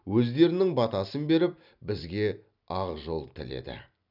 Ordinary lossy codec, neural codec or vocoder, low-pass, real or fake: none; none; 5.4 kHz; real